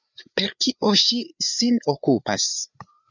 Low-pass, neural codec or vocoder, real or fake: 7.2 kHz; codec, 16 kHz, 4 kbps, FreqCodec, larger model; fake